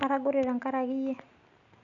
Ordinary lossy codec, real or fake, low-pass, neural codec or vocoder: none; real; 7.2 kHz; none